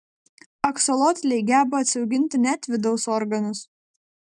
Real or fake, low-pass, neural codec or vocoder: real; 10.8 kHz; none